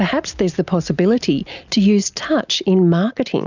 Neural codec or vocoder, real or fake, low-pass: none; real; 7.2 kHz